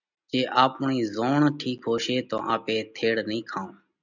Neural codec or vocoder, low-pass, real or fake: none; 7.2 kHz; real